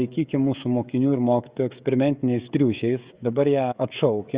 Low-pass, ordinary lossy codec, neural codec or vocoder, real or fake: 3.6 kHz; Opus, 64 kbps; codec, 16 kHz, 16 kbps, FreqCodec, smaller model; fake